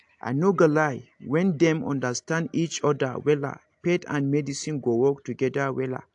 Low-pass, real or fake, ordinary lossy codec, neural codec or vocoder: 10.8 kHz; real; AAC, 64 kbps; none